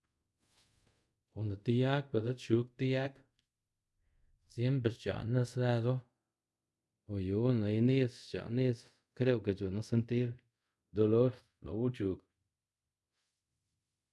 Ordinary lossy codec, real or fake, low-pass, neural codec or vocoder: none; fake; none; codec, 24 kHz, 0.5 kbps, DualCodec